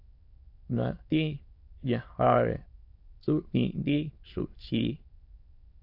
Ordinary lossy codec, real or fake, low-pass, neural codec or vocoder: AAC, 32 kbps; fake; 5.4 kHz; autoencoder, 22.05 kHz, a latent of 192 numbers a frame, VITS, trained on many speakers